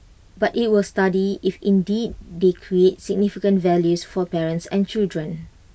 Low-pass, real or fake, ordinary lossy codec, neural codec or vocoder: none; real; none; none